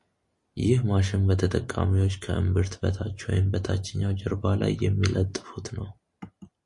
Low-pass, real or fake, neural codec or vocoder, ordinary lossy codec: 10.8 kHz; real; none; MP3, 48 kbps